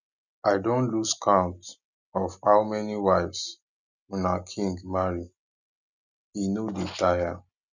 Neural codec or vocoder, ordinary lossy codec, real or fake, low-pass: none; none; real; 7.2 kHz